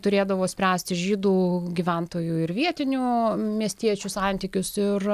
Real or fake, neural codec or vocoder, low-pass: real; none; 14.4 kHz